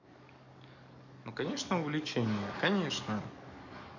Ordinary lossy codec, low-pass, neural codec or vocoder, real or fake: none; 7.2 kHz; codec, 44.1 kHz, 7.8 kbps, DAC; fake